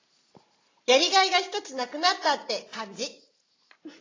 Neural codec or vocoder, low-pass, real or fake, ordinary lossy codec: none; 7.2 kHz; real; AAC, 32 kbps